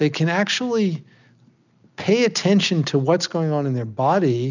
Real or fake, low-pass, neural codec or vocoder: real; 7.2 kHz; none